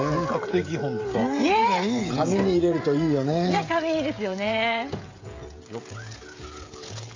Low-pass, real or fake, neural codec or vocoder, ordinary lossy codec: 7.2 kHz; fake; codec, 16 kHz, 16 kbps, FreqCodec, smaller model; AAC, 32 kbps